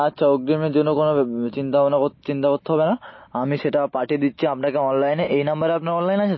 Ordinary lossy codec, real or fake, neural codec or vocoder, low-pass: MP3, 24 kbps; real; none; 7.2 kHz